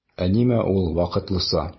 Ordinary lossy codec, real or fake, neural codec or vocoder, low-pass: MP3, 24 kbps; real; none; 7.2 kHz